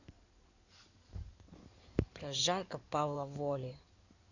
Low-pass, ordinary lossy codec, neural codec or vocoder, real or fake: 7.2 kHz; none; codec, 16 kHz in and 24 kHz out, 2.2 kbps, FireRedTTS-2 codec; fake